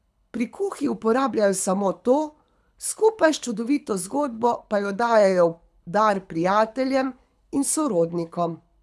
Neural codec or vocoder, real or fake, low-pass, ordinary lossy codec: codec, 24 kHz, 6 kbps, HILCodec; fake; none; none